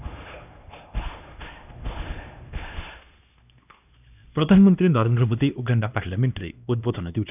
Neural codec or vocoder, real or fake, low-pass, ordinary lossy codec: codec, 16 kHz, 1 kbps, X-Codec, HuBERT features, trained on LibriSpeech; fake; 3.6 kHz; none